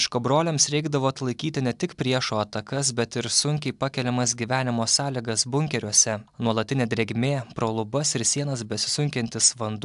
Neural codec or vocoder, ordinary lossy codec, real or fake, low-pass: none; AAC, 96 kbps; real; 10.8 kHz